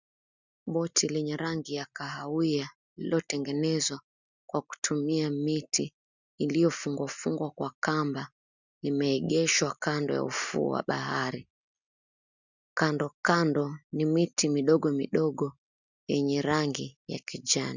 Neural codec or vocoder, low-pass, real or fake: none; 7.2 kHz; real